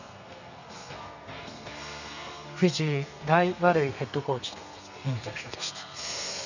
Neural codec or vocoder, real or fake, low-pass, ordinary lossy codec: codec, 32 kHz, 1.9 kbps, SNAC; fake; 7.2 kHz; none